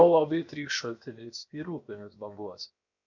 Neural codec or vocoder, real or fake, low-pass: codec, 16 kHz, 0.7 kbps, FocalCodec; fake; 7.2 kHz